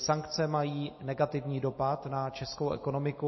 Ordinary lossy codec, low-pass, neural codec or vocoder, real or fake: MP3, 24 kbps; 7.2 kHz; none; real